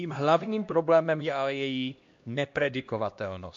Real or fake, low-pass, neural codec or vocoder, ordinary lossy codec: fake; 7.2 kHz; codec, 16 kHz, 1 kbps, X-Codec, HuBERT features, trained on LibriSpeech; MP3, 48 kbps